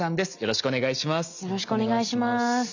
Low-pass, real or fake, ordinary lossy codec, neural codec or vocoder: 7.2 kHz; real; none; none